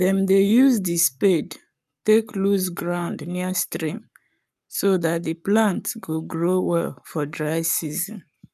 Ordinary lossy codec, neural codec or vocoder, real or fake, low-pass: none; codec, 44.1 kHz, 7.8 kbps, Pupu-Codec; fake; 14.4 kHz